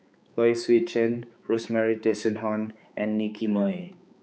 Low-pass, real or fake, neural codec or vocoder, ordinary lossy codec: none; fake; codec, 16 kHz, 4 kbps, X-Codec, HuBERT features, trained on balanced general audio; none